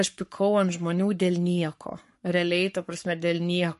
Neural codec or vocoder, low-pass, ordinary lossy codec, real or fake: codec, 44.1 kHz, 7.8 kbps, Pupu-Codec; 14.4 kHz; MP3, 48 kbps; fake